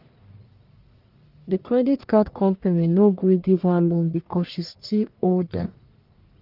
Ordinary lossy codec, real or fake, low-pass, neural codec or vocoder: Opus, 24 kbps; fake; 5.4 kHz; codec, 44.1 kHz, 1.7 kbps, Pupu-Codec